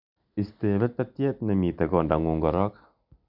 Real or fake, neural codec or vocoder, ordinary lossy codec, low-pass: real; none; none; 5.4 kHz